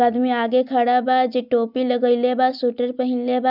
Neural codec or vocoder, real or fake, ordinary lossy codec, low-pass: none; real; none; 5.4 kHz